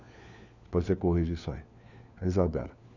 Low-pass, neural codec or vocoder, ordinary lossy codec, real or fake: 7.2 kHz; codec, 16 kHz, 4 kbps, FunCodec, trained on LibriTTS, 50 frames a second; none; fake